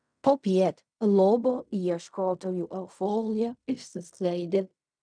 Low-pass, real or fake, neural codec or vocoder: 9.9 kHz; fake; codec, 16 kHz in and 24 kHz out, 0.4 kbps, LongCat-Audio-Codec, fine tuned four codebook decoder